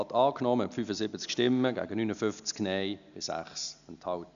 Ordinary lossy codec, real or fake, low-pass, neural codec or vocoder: none; real; 7.2 kHz; none